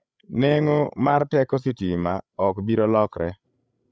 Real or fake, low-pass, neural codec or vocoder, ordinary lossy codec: fake; none; codec, 16 kHz, 8 kbps, FreqCodec, larger model; none